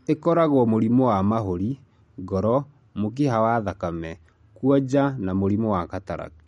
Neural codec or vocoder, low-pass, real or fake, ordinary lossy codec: none; 19.8 kHz; real; MP3, 48 kbps